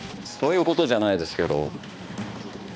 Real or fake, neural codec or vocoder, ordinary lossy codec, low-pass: fake; codec, 16 kHz, 2 kbps, X-Codec, HuBERT features, trained on balanced general audio; none; none